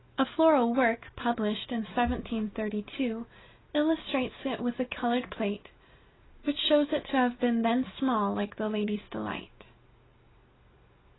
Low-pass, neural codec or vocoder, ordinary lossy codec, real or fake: 7.2 kHz; none; AAC, 16 kbps; real